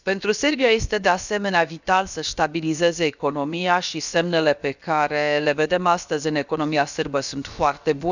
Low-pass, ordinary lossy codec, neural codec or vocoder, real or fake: 7.2 kHz; none; codec, 16 kHz, about 1 kbps, DyCAST, with the encoder's durations; fake